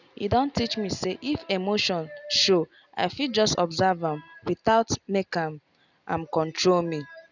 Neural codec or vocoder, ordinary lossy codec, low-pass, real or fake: none; none; 7.2 kHz; real